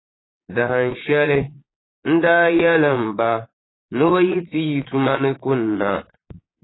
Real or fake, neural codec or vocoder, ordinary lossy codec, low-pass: fake; vocoder, 22.05 kHz, 80 mel bands, Vocos; AAC, 16 kbps; 7.2 kHz